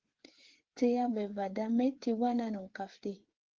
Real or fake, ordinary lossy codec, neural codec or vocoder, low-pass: fake; Opus, 16 kbps; codec, 16 kHz, 8 kbps, FreqCodec, smaller model; 7.2 kHz